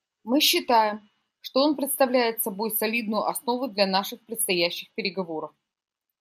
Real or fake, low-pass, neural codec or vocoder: real; 14.4 kHz; none